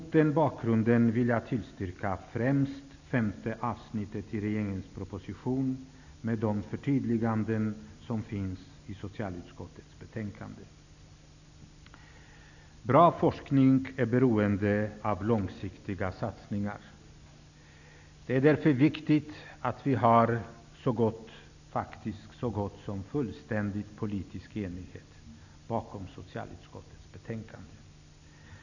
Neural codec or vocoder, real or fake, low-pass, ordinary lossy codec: none; real; 7.2 kHz; none